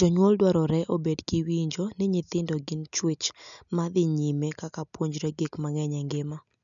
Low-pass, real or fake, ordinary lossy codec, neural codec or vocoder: 7.2 kHz; real; none; none